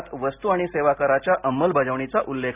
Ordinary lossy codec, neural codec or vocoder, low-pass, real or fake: none; none; 3.6 kHz; real